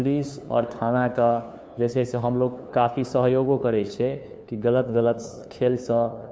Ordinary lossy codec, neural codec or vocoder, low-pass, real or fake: none; codec, 16 kHz, 2 kbps, FunCodec, trained on LibriTTS, 25 frames a second; none; fake